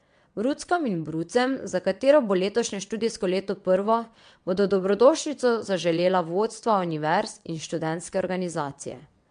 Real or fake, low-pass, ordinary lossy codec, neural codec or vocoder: fake; 9.9 kHz; MP3, 64 kbps; vocoder, 22.05 kHz, 80 mel bands, WaveNeXt